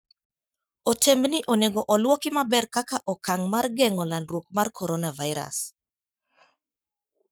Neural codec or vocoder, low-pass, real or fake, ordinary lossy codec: codec, 44.1 kHz, 7.8 kbps, Pupu-Codec; none; fake; none